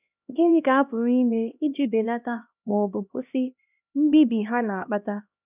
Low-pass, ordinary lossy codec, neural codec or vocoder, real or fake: 3.6 kHz; none; codec, 16 kHz, 1 kbps, X-Codec, HuBERT features, trained on LibriSpeech; fake